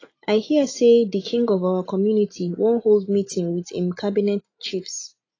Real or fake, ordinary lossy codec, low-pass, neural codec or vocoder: real; AAC, 32 kbps; 7.2 kHz; none